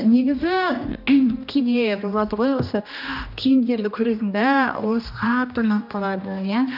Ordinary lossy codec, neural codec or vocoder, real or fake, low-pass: none; codec, 16 kHz, 1 kbps, X-Codec, HuBERT features, trained on general audio; fake; 5.4 kHz